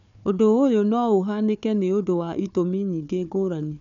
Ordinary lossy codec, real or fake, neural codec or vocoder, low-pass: none; fake; codec, 16 kHz, 4 kbps, FunCodec, trained on Chinese and English, 50 frames a second; 7.2 kHz